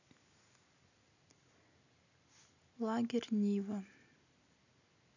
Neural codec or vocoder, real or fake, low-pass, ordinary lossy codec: none; real; 7.2 kHz; none